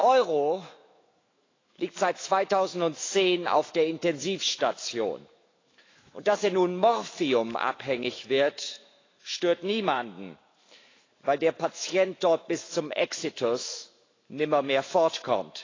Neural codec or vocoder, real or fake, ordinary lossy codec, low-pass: autoencoder, 48 kHz, 128 numbers a frame, DAC-VAE, trained on Japanese speech; fake; AAC, 32 kbps; 7.2 kHz